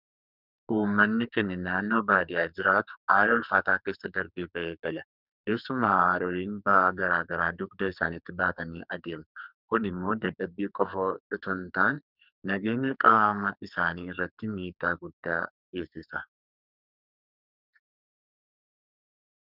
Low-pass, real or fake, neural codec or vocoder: 5.4 kHz; fake; codec, 44.1 kHz, 2.6 kbps, SNAC